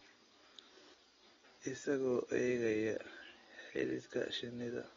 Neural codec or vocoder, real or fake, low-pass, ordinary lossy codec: none; real; 7.2 kHz; AAC, 24 kbps